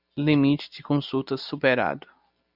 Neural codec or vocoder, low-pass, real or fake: none; 5.4 kHz; real